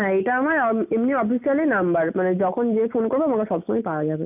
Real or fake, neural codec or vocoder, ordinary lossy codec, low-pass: real; none; none; 3.6 kHz